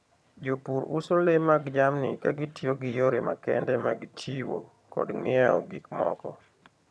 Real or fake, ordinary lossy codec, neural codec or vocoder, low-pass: fake; none; vocoder, 22.05 kHz, 80 mel bands, HiFi-GAN; none